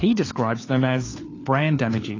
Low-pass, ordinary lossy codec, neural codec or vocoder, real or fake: 7.2 kHz; AAC, 32 kbps; codec, 16 kHz, 4 kbps, FunCodec, trained on LibriTTS, 50 frames a second; fake